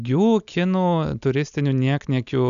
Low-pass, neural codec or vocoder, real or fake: 7.2 kHz; none; real